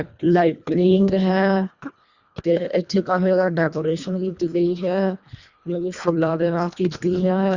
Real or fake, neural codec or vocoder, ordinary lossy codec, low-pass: fake; codec, 24 kHz, 1.5 kbps, HILCodec; Opus, 64 kbps; 7.2 kHz